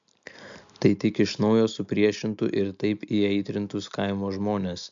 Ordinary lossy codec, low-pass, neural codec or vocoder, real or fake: MP3, 64 kbps; 7.2 kHz; none; real